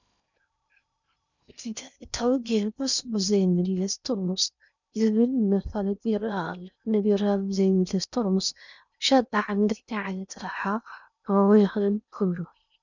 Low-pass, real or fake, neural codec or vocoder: 7.2 kHz; fake; codec, 16 kHz in and 24 kHz out, 0.6 kbps, FocalCodec, streaming, 2048 codes